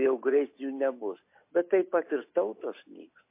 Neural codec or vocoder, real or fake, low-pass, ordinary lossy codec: none; real; 3.6 kHz; MP3, 24 kbps